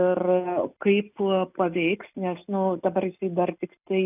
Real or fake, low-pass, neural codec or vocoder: real; 3.6 kHz; none